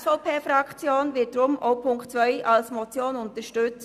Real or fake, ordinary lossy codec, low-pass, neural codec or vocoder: real; none; 14.4 kHz; none